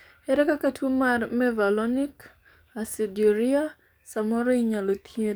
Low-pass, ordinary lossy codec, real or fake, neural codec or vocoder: none; none; fake; codec, 44.1 kHz, 7.8 kbps, DAC